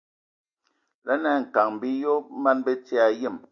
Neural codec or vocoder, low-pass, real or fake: none; 7.2 kHz; real